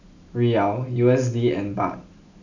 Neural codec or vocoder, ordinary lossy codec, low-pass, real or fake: none; none; 7.2 kHz; real